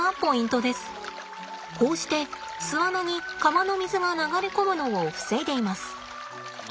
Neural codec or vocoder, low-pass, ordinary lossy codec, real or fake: none; none; none; real